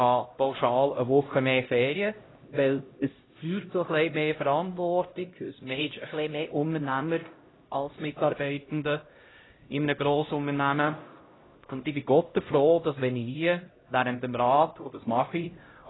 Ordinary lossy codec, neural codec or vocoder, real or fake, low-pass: AAC, 16 kbps; codec, 16 kHz, 0.5 kbps, X-Codec, HuBERT features, trained on LibriSpeech; fake; 7.2 kHz